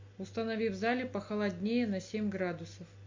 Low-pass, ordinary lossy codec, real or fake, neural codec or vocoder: 7.2 kHz; MP3, 48 kbps; real; none